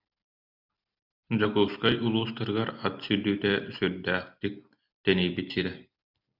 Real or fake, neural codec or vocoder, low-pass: real; none; 5.4 kHz